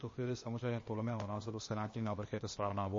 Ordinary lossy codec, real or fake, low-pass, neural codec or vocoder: MP3, 32 kbps; fake; 7.2 kHz; codec, 16 kHz, 0.8 kbps, ZipCodec